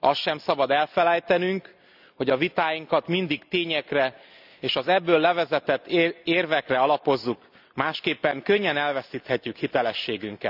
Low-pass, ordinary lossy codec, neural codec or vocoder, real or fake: 5.4 kHz; none; none; real